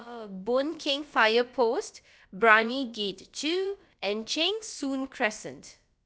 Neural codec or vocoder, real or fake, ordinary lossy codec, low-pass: codec, 16 kHz, about 1 kbps, DyCAST, with the encoder's durations; fake; none; none